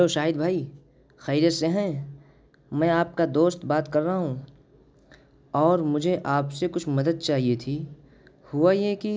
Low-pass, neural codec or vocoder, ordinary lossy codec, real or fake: none; none; none; real